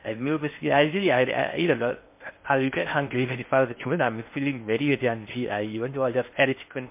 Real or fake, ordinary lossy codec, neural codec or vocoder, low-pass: fake; MP3, 32 kbps; codec, 16 kHz in and 24 kHz out, 0.6 kbps, FocalCodec, streaming, 4096 codes; 3.6 kHz